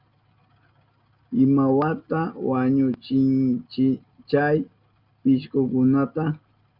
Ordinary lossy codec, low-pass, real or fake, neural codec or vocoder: Opus, 24 kbps; 5.4 kHz; real; none